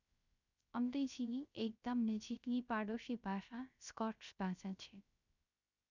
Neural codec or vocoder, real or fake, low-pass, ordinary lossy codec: codec, 16 kHz, 0.3 kbps, FocalCodec; fake; 7.2 kHz; none